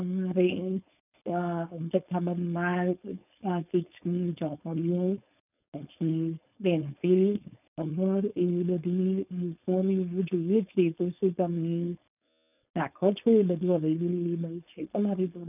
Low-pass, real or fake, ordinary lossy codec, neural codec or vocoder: 3.6 kHz; fake; none; codec, 16 kHz, 4.8 kbps, FACodec